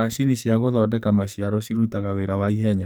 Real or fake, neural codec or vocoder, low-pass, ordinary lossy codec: fake; codec, 44.1 kHz, 2.6 kbps, SNAC; none; none